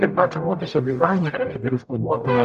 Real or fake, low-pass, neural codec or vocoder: fake; 14.4 kHz; codec, 44.1 kHz, 0.9 kbps, DAC